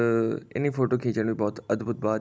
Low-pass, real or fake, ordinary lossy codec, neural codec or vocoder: none; real; none; none